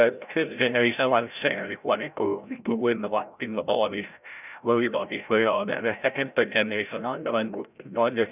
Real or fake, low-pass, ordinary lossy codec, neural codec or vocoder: fake; 3.6 kHz; none; codec, 16 kHz, 0.5 kbps, FreqCodec, larger model